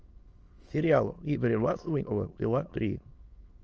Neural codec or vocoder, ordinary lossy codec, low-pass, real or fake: autoencoder, 22.05 kHz, a latent of 192 numbers a frame, VITS, trained on many speakers; Opus, 24 kbps; 7.2 kHz; fake